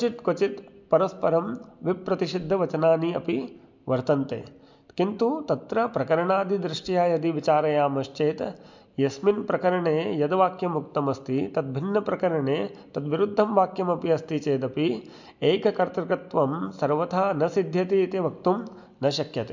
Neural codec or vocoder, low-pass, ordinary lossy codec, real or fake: none; 7.2 kHz; MP3, 64 kbps; real